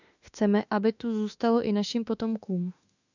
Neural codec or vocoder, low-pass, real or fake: autoencoder, 48 kHz, 32 numbers a frame, DAC-VAE, trained on Japanese speech; 7.2 kHz; fake